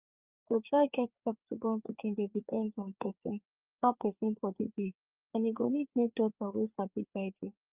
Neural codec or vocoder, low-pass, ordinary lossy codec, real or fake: codec, 16 kHz, 4 kbps, FreqCodec, larger model; 3.6 kHz; Opus, 24 kbps; fake